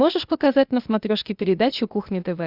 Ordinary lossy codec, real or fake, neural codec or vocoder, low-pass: Opus, 64 kbps; fake; codec, 16 kHz, 0.8 kbps, ZipCodec; 5.4 kHz